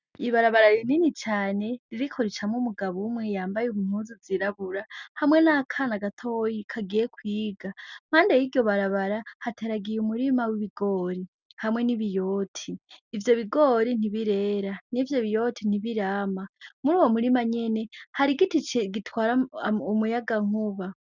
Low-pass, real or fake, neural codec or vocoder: 7.2 kHz; real; none